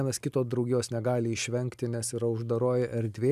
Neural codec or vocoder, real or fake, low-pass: none; real; 14.4 kHz